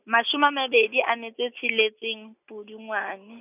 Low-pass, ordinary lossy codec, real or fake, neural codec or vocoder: 3.6 kHz; none; real; none